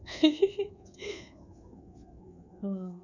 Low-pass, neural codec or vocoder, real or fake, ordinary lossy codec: 7.2 kHz; codec, 24 kHz, 1.2 kbps, DualCodec; fake; none